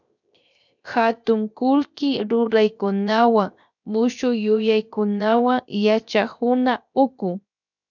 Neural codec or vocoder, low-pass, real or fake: codec, 16 kHz, 0.7 kbps, FocalCodec; 7.2 kHz; fake